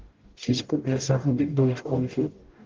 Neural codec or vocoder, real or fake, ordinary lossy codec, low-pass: codec, 44.1 kHz, 0.9 kbps, DAC; fake; Opus, 16 kbps; 7.2 kHz